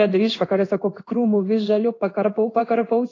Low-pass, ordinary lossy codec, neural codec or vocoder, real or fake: 7.2 kHz; AAC, 32 kbps; codec, 16 kHz in and 24 kHz out, 1 kbps, XY-Tokenizer; fake